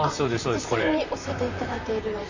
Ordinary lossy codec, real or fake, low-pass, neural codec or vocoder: Opus, 64 kbps; fake; 7.2 kHz; vocoder, 44.1 kHz, 128 mel bands, Pupu-Vocoder